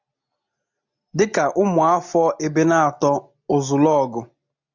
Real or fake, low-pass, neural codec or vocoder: real; 7.2 kHz; none